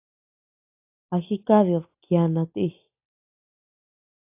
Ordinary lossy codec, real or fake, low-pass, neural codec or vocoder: AAC, 32 kbps; real; 3.6 kHz; none